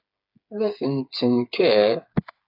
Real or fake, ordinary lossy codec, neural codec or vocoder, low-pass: fake; AAC, 48 kbps; codec, 16 kHz, 8 kbps, FreqCodec, smaller model; 5.4 kHz